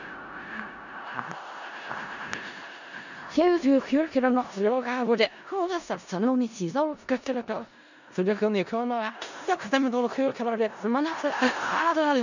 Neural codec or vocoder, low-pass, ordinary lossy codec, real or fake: codec, 16 kHz in and 24 kHz out, 0.4 kbps, LongCat-Audio-Codec, four codebook decoder; 7.2 kHz; none; fake